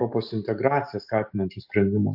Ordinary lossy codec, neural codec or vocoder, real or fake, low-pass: AAC, 48 kbps; none; real; 5.4 kHz